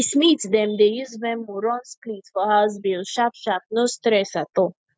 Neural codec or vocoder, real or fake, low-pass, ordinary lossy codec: none; real; none; none